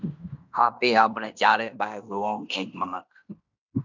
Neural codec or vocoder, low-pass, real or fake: codec, 16 kHz in and 24 kHz out, 0.9 kbps, LongCat-Audio-Codec, fine tuned four codebook decoder; 7.2 kHz; fake